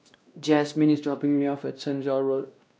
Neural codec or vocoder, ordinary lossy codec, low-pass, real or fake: codec, 16 kHz, 1 kbps, X-Codec, WavLM features, trained on Multilingual LibriSpeech; none; none; fake